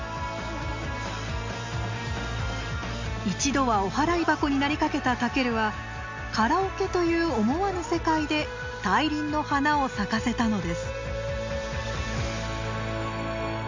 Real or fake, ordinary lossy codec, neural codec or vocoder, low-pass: real; MP3, 64 kbps; none; 7.2 kHz